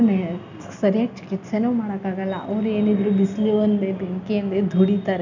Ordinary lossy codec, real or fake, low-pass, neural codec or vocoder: none; real; 7.2 kHz; none